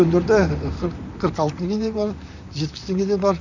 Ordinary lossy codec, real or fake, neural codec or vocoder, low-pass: none; fake; vocoder, 44.1 kHz, 128 mel bands, Pupu-Vocoder; 7.2 kHz